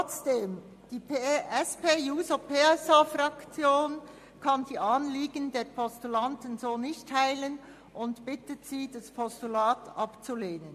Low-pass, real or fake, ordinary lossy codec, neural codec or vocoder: 14.4 kHz; real; MP3, 64 kbps; none